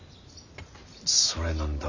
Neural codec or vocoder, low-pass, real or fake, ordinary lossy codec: none; 7.2 kHz; real; none